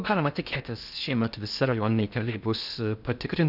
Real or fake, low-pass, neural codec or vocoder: fake; 5.4 kHz; codec, 16 kHz in and 24 kHz out, 0.6 kbps, FocalCodec, streaming, 2048 codes